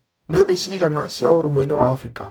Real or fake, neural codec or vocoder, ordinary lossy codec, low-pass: fake; codec, 44.1 kHz, 0.9 kbps, DAC; none; none